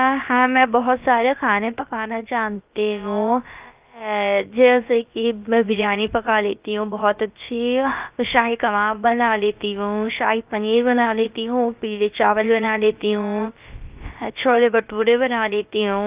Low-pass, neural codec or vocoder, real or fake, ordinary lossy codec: 3.6 kHz; codec, 16 kHz, about 1 kbps, DyCAST, with the encoder's durations; fake; Opus, 24 kbps